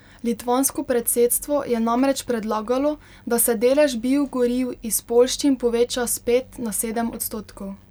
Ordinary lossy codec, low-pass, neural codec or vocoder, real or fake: none; none; none; real